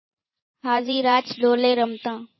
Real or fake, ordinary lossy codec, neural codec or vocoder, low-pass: fake; MP3, 24 kbps; vocoder, 44.1 kHz, 128 mel bands every 512 samples, BigVGAN v2; 7.2 kHz